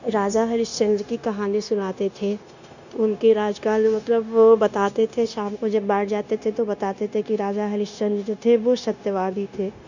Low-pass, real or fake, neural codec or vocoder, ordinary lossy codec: 7.2 kHz; fake; codec, 16 kHz, 0.9 kbps, LongCat-Audio-Codec; none